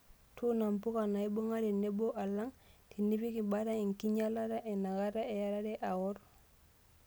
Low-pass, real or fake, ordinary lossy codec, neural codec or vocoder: none; real; none; none